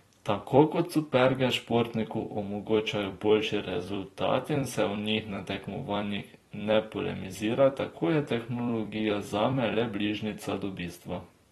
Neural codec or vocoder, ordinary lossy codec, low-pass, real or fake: vocoder, 44.1 kHz, 128 mel bands every 256 samples, BigVGAN v2; AAC, 32 kbps; 19.8 kHz; fake